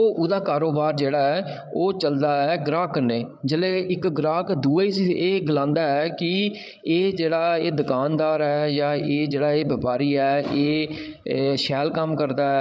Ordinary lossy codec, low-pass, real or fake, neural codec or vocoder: none; none; fake; codec, 16 kHz, 8 kbps, FreqCodec, larger model